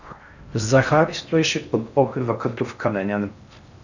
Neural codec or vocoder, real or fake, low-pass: codec, 16 kHz in and 24 kHz out, 0.6 kbps, FocalCodec, streaming, 4096 codes; fake; 7.2 kHz